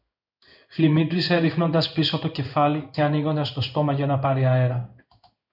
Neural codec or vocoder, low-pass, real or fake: codec, 16 kHz in and 24 kHz out, 1 kbps, XY-Tokenizer; 5.4 kHz; fake